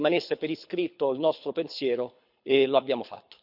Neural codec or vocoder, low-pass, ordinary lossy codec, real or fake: codec, 24 kHz, 6 kbps, HILCodec; 5.4 kHz; none; fake